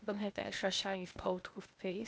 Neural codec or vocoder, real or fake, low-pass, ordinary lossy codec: codec, 16 kHz, 0.8 kbps, ZipCodec; fake; none; none